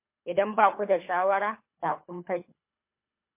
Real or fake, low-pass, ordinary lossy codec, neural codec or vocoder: fake; 3.6 kHz; MP3, 24 kbps; codec, 24 kHz, 3 kbps, HILCodec